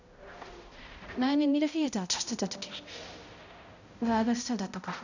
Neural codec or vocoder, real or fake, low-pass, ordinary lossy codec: codec, 16 kHz, 0.5 kbps, X-Codec, HuBERT features, trained on balanced general audio; fake; 7.2 kHz; none